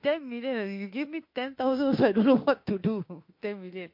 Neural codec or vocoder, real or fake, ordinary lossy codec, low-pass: autoencoder, 48 kHz, 32 numbers a frame, DAC-VAE, trained on Japanese speech; fake; MP3, 32 kbps; 5.4 kHz